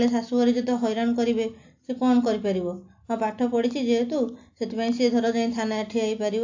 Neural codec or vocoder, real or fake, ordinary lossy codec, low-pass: none; real; AAC, 48 kbps; 7.2 kHz